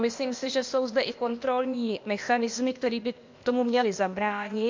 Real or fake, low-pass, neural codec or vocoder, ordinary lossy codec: fake; 7.2 kHz; codec, 16 kHz, 0.8 kbps, ZipCodec; MP3, 48 kbps